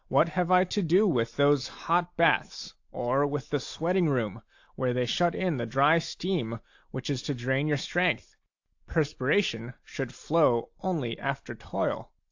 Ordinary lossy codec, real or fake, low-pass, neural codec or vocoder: AAC, 48 kbps; real; 7.2 kHz; none